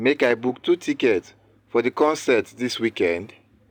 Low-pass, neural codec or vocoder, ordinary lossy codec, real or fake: 19.8 kHz; none; none; real